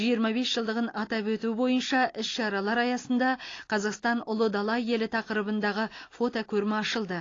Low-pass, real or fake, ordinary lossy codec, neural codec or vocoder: 7.2 kHz; real; AAC, 32 kbps; none